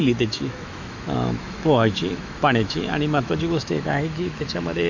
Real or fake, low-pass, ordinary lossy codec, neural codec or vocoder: fake; 7.2 kHz; none; autoencoder, 48 kHz, 128 numbers a frame, DAC-VAE, trained on Japanese speech